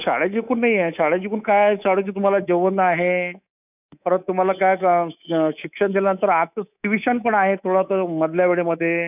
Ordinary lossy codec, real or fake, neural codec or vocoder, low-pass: none; fake; codec, 24 kHz, 3.1 kbps, DualCodec; 3.6 kHz